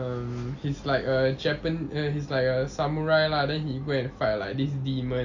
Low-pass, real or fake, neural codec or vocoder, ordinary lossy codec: 7.2 kHz; real; none; AAC, 48 kbps